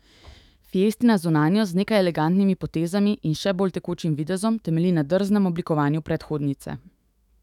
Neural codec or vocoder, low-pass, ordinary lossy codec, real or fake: autoencoder, 48 kHz, 128 numbers a frame, DAC-VAE, trained on Japanese speech; 19.8 kHz; none; fake